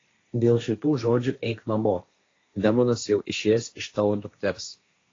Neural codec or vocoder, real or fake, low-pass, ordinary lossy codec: codec, 16 kHz, 1.1 kbps, Voila-Tokenizer; fake; 7.2 kHz; AAC, 32 kbps